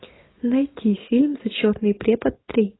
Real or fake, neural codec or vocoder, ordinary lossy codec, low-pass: real; none; AAC, 16 kbps; 7.2 kHz